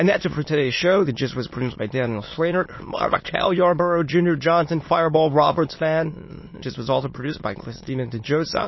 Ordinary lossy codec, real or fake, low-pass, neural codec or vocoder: MP3, 24 kbps; fake; 7.2 kHz; autoencoder, 22.05 kHz, a latent of 192 numbers a frame, VITS, trained on many speakers